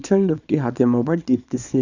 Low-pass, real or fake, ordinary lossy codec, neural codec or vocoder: 7.2 kHz; fake; none; codec, 24 kHz, 0.9 kbps, WavTokenizer, small release